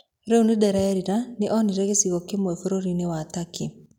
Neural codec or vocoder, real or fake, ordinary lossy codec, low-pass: none; real; none; 19.8 kHz